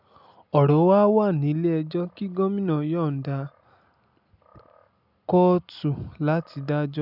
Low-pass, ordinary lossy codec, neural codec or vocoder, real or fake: 5.4 kHz; none; none; real